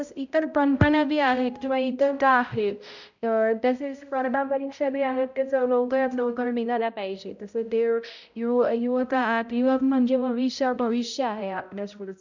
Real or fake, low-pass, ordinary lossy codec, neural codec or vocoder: fake; 7.2 kHz; none; codec, 16 kHz, 0.5 kbps, X-Codec, HuBERT features, trained on balanced general audio